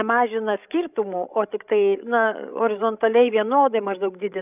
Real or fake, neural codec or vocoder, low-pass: fake; codec, 16 kHz, 16 kbps, FreqCodec, larger model; 3.6 kHz